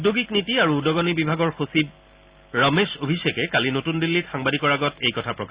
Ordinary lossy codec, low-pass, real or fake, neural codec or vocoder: Opus, 64 kbps; 3.6 kHz; real; none